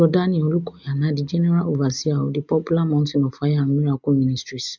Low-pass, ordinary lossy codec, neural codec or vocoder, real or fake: 7.2 kHz; none; none; real